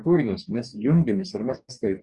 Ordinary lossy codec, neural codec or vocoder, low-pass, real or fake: Opus, 64 kbps; codec, 44.1 kHz, 2.6 kbps, DAC; 10.8 kHz; fake